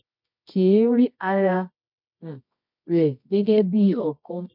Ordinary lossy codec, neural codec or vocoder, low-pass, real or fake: MP3, 48 kbps; codec, 24 kHz, 0.9 kbps, WavTokenizer, medium music audio release; 5.4 kHz; fake